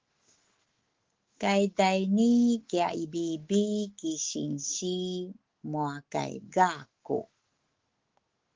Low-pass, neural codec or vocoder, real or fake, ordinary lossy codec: 7.2 kHz; autoencoder, 48 kHz, 128 numbers a frame, DAC-VAE, trained on Japanese speech; fake; Opus, 16 kbps